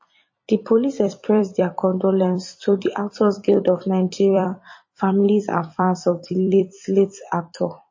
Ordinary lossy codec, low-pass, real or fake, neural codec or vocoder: MP3, 32 kbps; 7.2 kHz; fake; vocoder, 44.1 kHz, 128 mel bands every 256 samples, BigVGAN v2